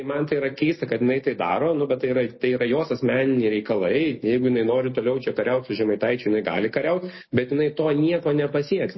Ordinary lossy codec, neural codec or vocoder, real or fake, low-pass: MP3, 24 kbps; none; real; 7.2 kHz